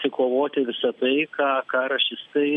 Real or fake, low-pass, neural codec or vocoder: real; 10.8 kHz; none